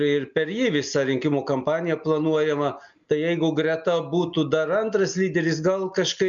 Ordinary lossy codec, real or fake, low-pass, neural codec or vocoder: MP3, 96 kbps; real; 7.2 kHz; none